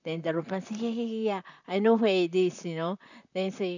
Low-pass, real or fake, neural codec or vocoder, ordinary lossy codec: 7.2 kHz; fake; vocoder, 44.1 kHz, 128 mel bands, Pupu-Vocoder; none